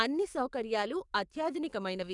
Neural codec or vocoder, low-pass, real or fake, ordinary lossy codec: codec, 44.1 kHz, 7.8 kbps, DAC; 10.8 kHz; fake; none